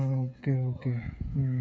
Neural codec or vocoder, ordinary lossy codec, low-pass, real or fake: codec, 16 kHz, 8 kbps, FreqCodec, smaller model; none; none; fake